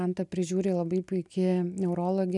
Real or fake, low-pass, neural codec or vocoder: real; 10.8 kHz; none